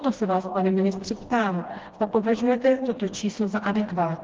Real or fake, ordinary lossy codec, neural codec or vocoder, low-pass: fake; Opus, 16 kbps; codec, 16 kHz, 1 kbps, FreqCodec, smaller model; 7.2 kHz